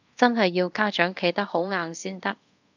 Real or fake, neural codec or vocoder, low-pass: fake; codec, 24 kHz, 0.5 kbps, DualCodec; 7.2 kHz